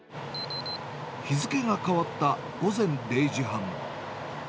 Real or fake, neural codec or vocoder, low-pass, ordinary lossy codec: real; none; none; none